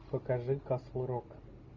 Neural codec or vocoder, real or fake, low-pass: none; real; 7.2 kHz